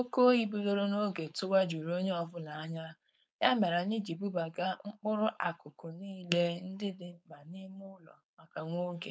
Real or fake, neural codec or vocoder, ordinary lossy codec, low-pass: fake; codec, 16 kHz, 4.8 kbps, FACodec; none; none